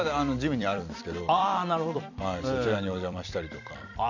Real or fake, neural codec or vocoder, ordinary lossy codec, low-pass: fake; vocoder, 44.1 kHz, 128 mel bands every 256 samples, BigVGAN v2; none; 7.2 kHz